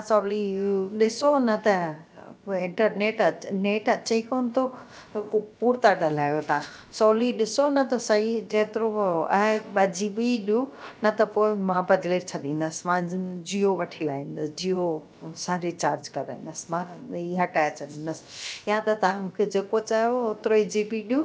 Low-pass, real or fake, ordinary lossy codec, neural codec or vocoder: none; fake; none; codec, 16 kHz, about 1 kbps, DyCAST, with the encoder's durations